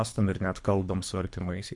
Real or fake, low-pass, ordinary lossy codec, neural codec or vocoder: fake; 10.8 kHz; MP3, 64 kbps; codec, 24 kHz, 3 kbps, HILCodec